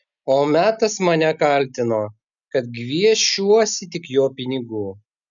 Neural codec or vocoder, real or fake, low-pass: none; real; 7.2 kHz